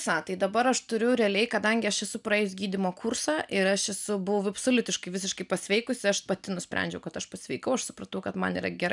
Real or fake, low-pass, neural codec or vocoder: real; 10.8 kHz; none